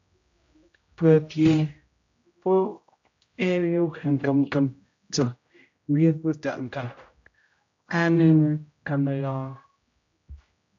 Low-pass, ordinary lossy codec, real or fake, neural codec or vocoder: 7.2 kHz; AAC, 48 kbps; fake; codec, 16 kHz, 0.5 kbps, X-Codec, HuBERT features, trained on general audio